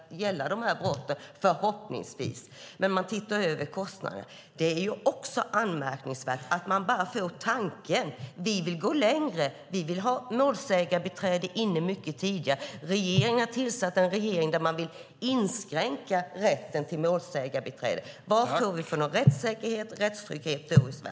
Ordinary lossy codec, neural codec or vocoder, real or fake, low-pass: none; none; real; none